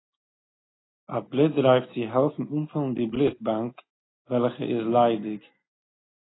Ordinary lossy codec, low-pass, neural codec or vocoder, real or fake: AAC, 16 kbps; 7.2 kHz; none; real